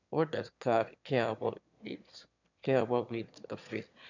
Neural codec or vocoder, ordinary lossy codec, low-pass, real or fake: autoencoder, 22.05 kHz, a latent of 192 numbers a frame, VITS, trained on one speaker; none; 7.2 kHz; fake